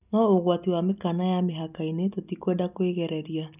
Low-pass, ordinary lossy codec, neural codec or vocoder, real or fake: 3.6 kHz; none; none; real